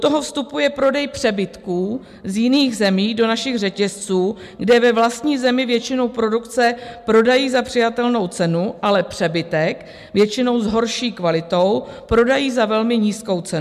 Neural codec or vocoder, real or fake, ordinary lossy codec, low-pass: none; real; MP3, 96 kbps; 14.4 kHz